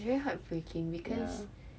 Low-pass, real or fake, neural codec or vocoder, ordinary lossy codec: none; real; none; none